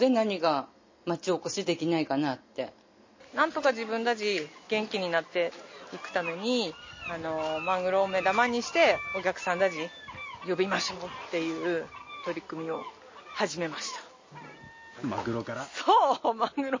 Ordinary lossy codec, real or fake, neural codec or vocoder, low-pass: MP3, 32 kbps; real; none; 7.2 kHz